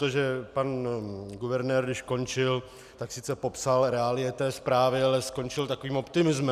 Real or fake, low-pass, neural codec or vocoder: real; 14.4 kHz; none